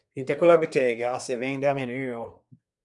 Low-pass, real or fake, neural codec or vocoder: 10.8 kHz; fake; codec, 24 kHz, 1 kbps, SNAC